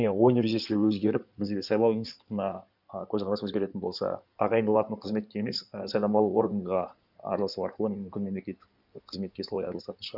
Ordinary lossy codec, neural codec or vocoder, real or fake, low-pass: none; codec, 16 kHz in and 24 kHz out, 2.2 kbps, FireRedTTS-2 codec; fake; 5.4 kHz